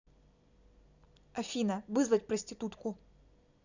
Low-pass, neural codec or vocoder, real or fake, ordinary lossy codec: 7.2 kHz; none; real; none